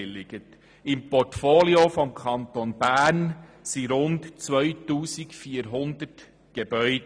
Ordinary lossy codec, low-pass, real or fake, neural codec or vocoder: none; 9.9 kHz; real; none